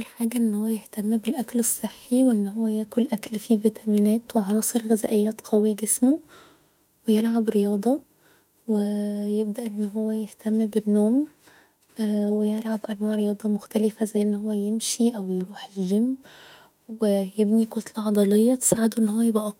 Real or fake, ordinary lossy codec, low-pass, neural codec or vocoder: fake; none; 19.8 kHz; autoencoder, 48 kHz, 32 numbers a frame, DAC-VAE, trained on Japanese speech